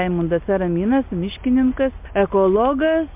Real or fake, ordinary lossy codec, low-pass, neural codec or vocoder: real; MP3, 32 kbps; 3.6 kHz; none